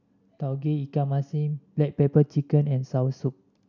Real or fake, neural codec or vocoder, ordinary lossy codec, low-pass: real; none; none; 7.2 kHz